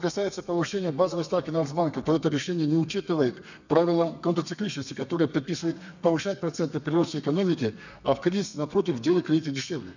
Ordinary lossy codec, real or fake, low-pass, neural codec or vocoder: none; fake; 7.2 kHz; codec, 32 kHz, 1.9 kbps, SNAC